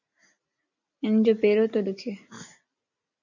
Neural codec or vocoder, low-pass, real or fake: none; 7.2 kHz; real